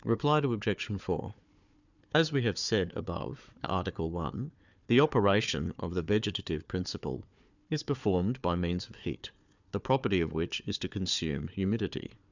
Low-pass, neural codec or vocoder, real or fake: 7.2 kHz; codec, 16 kHz, 4 kbps, FunCodec, trained on Chinese and English, 50 frames a second; fake